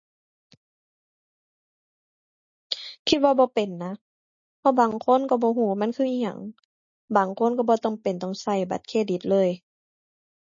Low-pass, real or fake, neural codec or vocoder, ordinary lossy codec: 7.2 kHz; real; none; MP3, 32 kbps